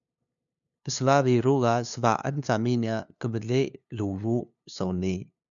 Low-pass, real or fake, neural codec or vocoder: 7.2 kHz; fake; codec, 16 kHz, 2 kbps, FunCodec, trained on LibriTTS, 25 frames a second